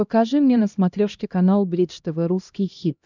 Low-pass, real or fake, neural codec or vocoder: 7.2 kHz; fake; codec, 16 kHz, 1 kbps, X-Codec, HuBERT features, trained on LibriSpeech